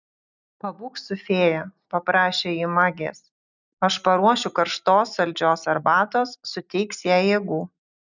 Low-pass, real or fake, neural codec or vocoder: 7.2 kHz; real; none